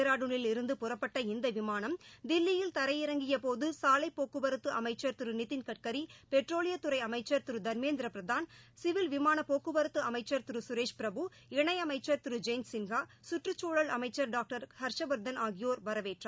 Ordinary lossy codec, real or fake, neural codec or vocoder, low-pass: none; real; none; none